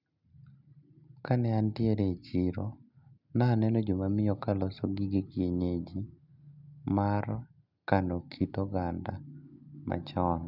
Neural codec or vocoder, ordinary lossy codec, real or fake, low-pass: none; none; real; 5.4 kHz